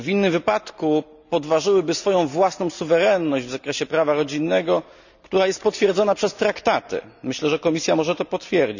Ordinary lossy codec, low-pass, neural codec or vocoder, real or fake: none; 7.2 kHz; none; real